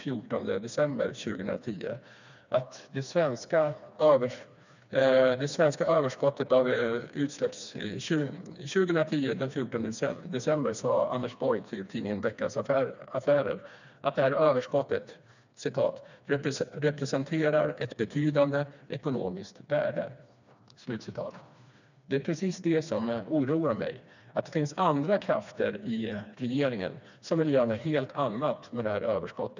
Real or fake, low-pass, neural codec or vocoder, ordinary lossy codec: fake; 7.2 kHz; codec, 16 kHz, 2 kbps, FreqCodec, smaller model; none